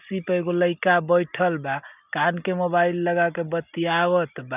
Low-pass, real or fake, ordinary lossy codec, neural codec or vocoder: 3.6 kHz; real; none; none